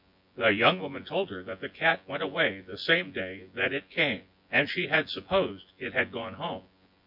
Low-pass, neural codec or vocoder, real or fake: 5.4 kHz; vocoder, 24 kHz, 100 mel bands, Vocos; fake